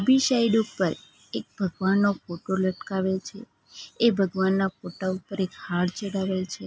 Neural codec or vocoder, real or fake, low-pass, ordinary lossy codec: none; real; none; none